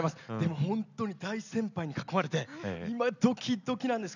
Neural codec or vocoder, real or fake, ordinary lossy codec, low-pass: none; real; none; 7.2 kHz